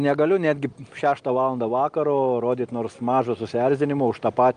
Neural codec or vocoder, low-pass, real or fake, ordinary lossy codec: none; 9.9 kHz; real; Opus, 32 kbps